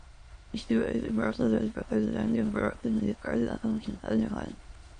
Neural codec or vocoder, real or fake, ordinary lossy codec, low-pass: autoencoder, 22.05 kHz, a latent of 192 numbers a frame, VITS, trained on many speakers; fake; MP3, 48 kbps; 9.9 kHz